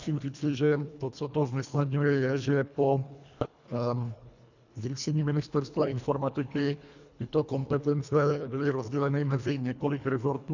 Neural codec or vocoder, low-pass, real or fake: codec, 24 kHz, 1.5 kbps, HILCodec; 7.2 kHz; fake